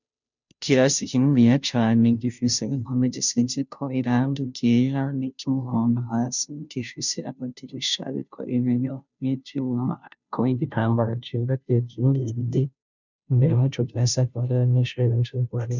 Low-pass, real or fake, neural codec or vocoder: 7.2 kHz; fake; codec, 16 kHz, 0.5 kbps, FunCodec, trained on Chinese and English, 25 frames a second